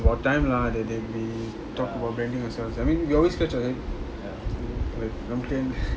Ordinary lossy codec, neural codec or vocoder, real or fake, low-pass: none; none; real; none